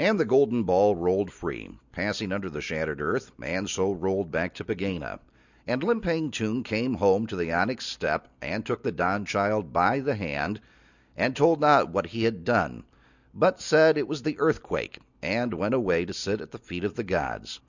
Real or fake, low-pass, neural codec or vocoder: real; 7.2 kHz; none